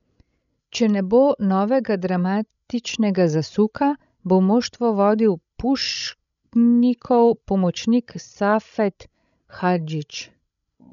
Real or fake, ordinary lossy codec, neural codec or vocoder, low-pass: fake; none; codec, 16 kHz, 16 kbps, FreqCodec, larger model; 7.2 kHz